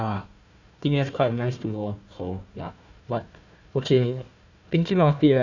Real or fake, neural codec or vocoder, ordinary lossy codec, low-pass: fake; codec, 16 kHz, 1 kbps, FunCodec, trained on Chinese and English, 50 frames a second; none; 7.2 kHz